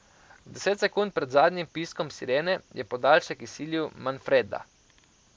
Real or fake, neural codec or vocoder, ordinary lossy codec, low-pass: real; none; none; none